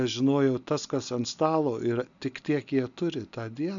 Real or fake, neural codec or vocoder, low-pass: real; none; 7.2 kHz